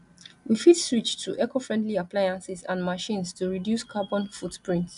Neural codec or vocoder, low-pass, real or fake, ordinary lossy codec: none; 10.8 kHz; real; AAC, 96 kbps